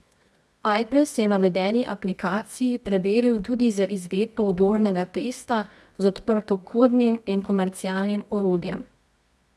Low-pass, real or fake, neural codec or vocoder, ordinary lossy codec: none; fake; codec, 24 kHz, 0.9 kbps, WavTokenizer, medium music audio release; none